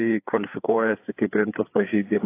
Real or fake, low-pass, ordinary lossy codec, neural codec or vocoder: fake; 3.6 kHz; AAC, 24 kbps; codec, 16 kHz, 4 kbps, FreqCodec, larger model